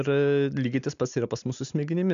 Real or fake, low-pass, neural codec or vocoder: real; 7.2 kHz; none